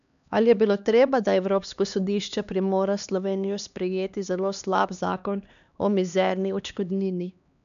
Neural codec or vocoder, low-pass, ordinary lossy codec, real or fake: codec, 16 kHz, 2 kbps, X-Codec, HuBERT features, trained on LibriSpeech; 7.2 kHz; none; fake